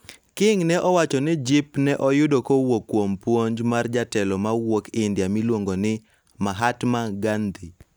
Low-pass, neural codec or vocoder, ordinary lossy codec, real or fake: none; none; none; real